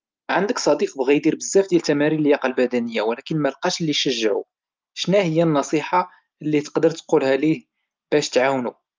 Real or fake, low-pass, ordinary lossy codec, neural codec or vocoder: real; 7.2 kHz; Opus, 32 kbps; none